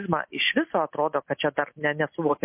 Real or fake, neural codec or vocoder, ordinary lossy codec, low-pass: real; none; MP3, 24 kbps; 3.6 kHz